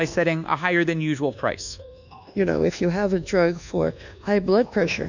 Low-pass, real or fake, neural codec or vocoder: 7.2 kHz; fake; codec, 24 kHz, 1.2 kbps, DualCodec